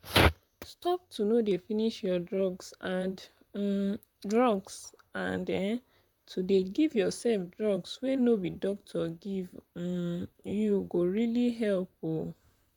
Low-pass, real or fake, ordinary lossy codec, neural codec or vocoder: 19.8 kHz; fake; none; vocoder, 44.1 kHz, 128 mel bands, Pupu-Vocoder